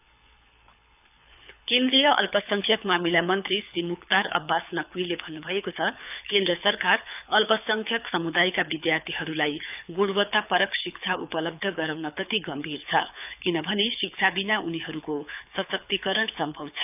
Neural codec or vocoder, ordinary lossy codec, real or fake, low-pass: codec, 24 kHz, 6 kbps, HILCodec; none; fake; 3.6 kHz